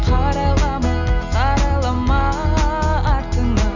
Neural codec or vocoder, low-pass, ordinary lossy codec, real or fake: none; 7.2 kHz; none; real